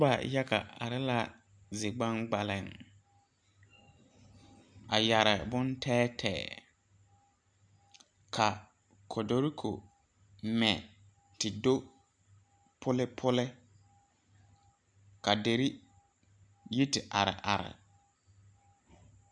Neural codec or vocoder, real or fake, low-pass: none; real; 9.9 kHz